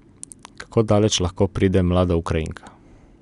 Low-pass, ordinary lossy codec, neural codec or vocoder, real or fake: 10.8 kHz; none; none; real